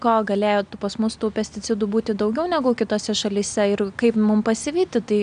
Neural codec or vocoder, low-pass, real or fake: none; 9.9 kHz; real